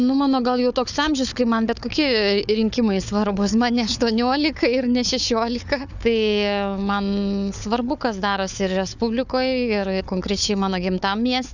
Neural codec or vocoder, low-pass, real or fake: codec, 16 kHz, 4 kbps, FunCodec, trained on Chinese and English, 50 frames a second; 7.2 kHz; fake